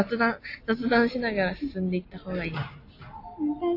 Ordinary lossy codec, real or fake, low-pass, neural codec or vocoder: AAC, 32 kbps; real; 5.4 kHz; none